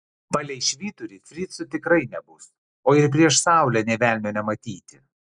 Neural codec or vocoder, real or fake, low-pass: none; real; 9.9 kHz